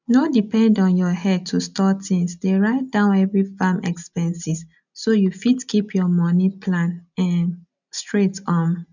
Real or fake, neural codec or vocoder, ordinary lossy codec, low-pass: real; none; none; 7.2 kHz